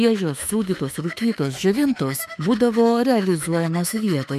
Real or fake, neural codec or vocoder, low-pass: fake; autoencoder, 48 kHz, 32 numbers a frame, DAC-VAE, trained on Japanese speech; 14.4 kHz